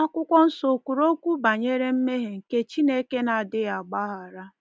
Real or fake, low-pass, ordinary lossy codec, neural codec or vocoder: real; none; none; none